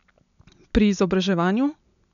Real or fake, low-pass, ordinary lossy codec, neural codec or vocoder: real; 7.2 kHz; none; none